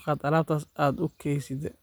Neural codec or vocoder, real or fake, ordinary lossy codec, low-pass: none; real; none; none